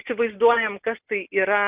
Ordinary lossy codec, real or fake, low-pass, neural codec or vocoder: Opus, 32 kbps; real; 3.6 kHz; none